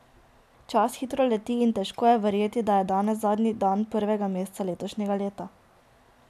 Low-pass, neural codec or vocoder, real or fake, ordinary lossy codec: 14.4 kHz; none; real; none